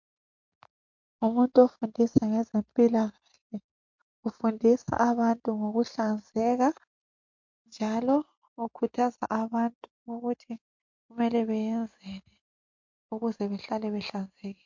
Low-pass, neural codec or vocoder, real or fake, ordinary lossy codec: 7.2 kHz; none; real; MP3, 48 kbps